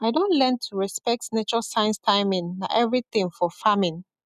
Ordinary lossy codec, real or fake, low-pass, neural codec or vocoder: none; real; 14.4 kHz; none